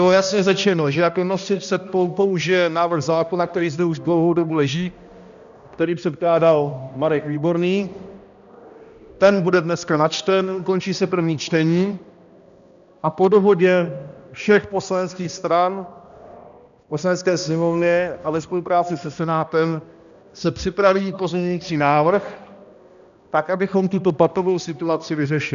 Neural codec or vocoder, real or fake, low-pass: codec, 16 kHz, 1 kbps, X-Codec, HuBERT features, trained on balanced general audio; fake; 7.2 kHz